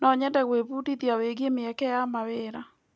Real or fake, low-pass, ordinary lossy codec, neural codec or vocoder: real; none; none; none